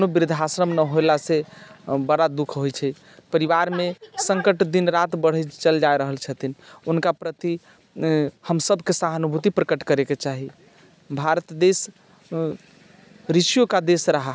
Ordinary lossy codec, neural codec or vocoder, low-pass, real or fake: none; none; none; real